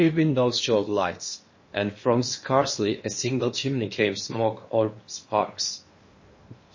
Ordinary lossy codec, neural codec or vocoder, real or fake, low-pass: MP3, 32 kbps; codec, 16 kHz in and 24 kHz out, 0.8 kbps, FocalCodec, streaming, 65536 codes; fake; 7.2 kHz